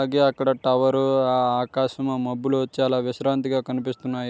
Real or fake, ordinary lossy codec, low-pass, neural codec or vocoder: real; none; none; none